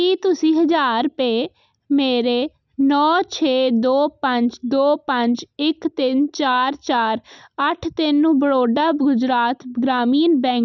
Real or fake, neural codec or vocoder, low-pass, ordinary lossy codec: real; none; 7.2 kHz; none